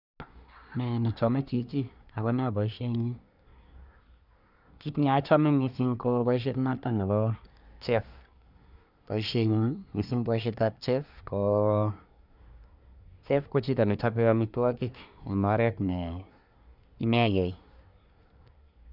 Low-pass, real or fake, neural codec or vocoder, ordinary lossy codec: 5.4 kHz; fake; codec, 24 kHz, 1 kbps, SNAC; none